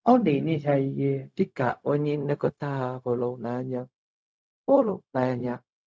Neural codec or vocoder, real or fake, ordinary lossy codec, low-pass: codec, 16 kHz, 0.4 kbps, LongCat-Audio-Codec; fake; none; none